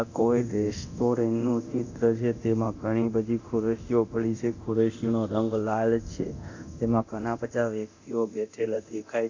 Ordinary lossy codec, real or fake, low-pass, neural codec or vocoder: AAC, 48 kbps; fake; 7.2 kHz; codec, 24 kHz, 0.9 kbps, DualCodec